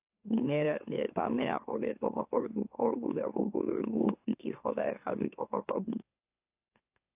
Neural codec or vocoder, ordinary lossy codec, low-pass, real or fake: autoencoder, 44.1 kHz, a latent of 192 numbers a frame, MeloTTS; AAC, 32 kbps; 3.6 kHz; fake